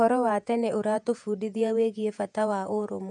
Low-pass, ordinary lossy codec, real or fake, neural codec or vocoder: 10.8 kHz; none; fake; vocoder, 48 kHz, 128 mel bands, Vocos